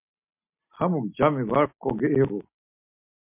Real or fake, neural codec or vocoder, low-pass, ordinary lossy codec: real; none; 3.6 kHz; MP3, 32 kbps